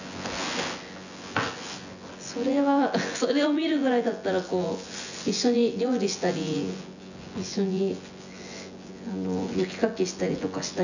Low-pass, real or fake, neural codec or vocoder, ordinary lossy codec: 7.2 kHz; fake; vocoder, 24 kHz, 100 mel bands, Vocos; none